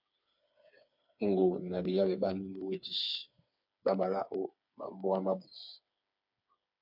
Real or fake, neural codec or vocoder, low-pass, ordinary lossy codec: fake; codec, 16 kHz, 4 kbps, FreqCodec, smaller model; 5.4 kHz; MP3, 48 kbps